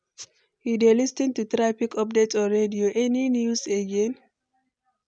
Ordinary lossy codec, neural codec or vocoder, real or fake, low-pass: none; none; real; 9.9 kHz